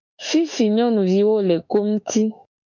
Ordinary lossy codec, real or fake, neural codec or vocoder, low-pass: MP3, 64 kbps; fake; autoencoder, 48 kHz, 32 numbers a frame, DAC-VAE, trained on Japanese speech; 7.2 kHz